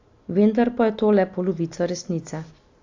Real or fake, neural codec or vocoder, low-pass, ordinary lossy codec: real; none; 7.2 kHz; AAC, 48 kbps